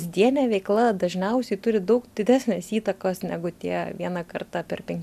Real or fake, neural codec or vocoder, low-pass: real; none; 14.4 kHz